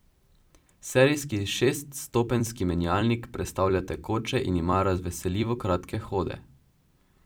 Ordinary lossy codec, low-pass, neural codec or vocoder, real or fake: none; none; vocoder, 44.1 kHz, 128 mel bands every 256 samples, BigVGAN v2; fake